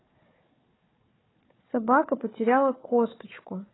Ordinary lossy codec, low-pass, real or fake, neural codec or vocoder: AAC, 16 kbps; 7.2 kHz; fake; codec, 16 kHz, 4 kbps, FunCodec, trained on Chinese and English, 50 frames a second